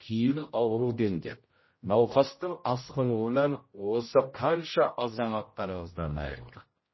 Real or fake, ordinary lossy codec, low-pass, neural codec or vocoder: fake; MP3, 24 kbps; 7.2 kHz; codec, 16 kHz, 0.5 kbps, X-Codec, HuBERT features, trained on general audio